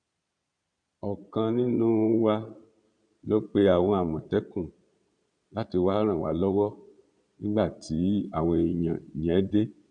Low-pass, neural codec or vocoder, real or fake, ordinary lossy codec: 9.9 kHz; vocoder, 22.05 kHz, 80 mel bands, Vocos; fake; none